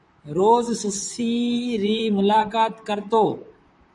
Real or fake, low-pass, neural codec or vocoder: fake; 10.8 kHz; vocoder, 44.1 kHz, 128 mel bands, Pupu-Vocoder